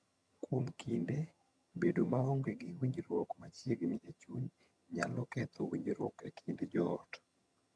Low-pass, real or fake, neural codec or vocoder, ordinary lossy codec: none; fake; vocoder, 22.05 kHz, 80 mel bands, HiFi-GAN; none